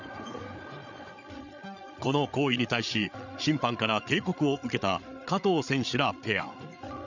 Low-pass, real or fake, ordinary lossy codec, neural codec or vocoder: 7.2 kHz; fake; none; codec, 16 kHz, 8 kbps, FreqCodec, larger model